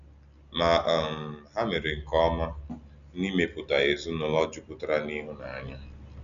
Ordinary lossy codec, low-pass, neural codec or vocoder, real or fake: none; 7.2 kHz; none; real